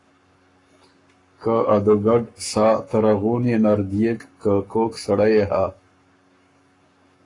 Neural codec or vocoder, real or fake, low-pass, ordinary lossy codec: codec, 44.1 kHz, 7.8 kbps, Pupu-Codec; fake; 10.8 kHz; AAC, 32 kbps